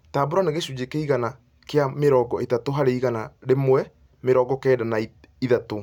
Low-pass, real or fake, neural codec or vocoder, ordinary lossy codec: 19.8 kHz; real; none; none